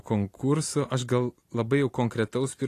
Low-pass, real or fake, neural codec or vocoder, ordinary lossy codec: 14.4 kHz; real; none; AAC, 48 kbps